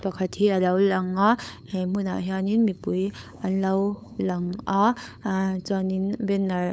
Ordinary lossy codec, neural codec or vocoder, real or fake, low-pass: none; codec, 16 kHz, 8 kbps, FunCodec, trained on LibriTTS, 25 frames a second; fake; none